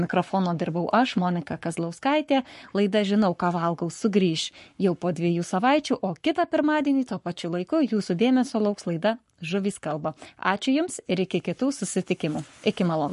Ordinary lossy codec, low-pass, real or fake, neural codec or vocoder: MP3, 48 kbps; 14.4 kHz; fake; codec, 44.1 kHz, 7.8 kbps, Pupu-Codec